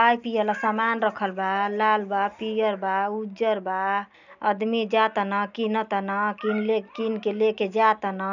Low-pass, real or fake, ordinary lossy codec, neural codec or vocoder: 7.2 kHz; real; none; none